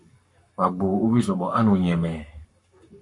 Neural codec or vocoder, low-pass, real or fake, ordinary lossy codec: codec, 44.1 kHz, 7.8 kbps, Pupu-Codec; 10.8 kHz; fake; MP3, 48 kbps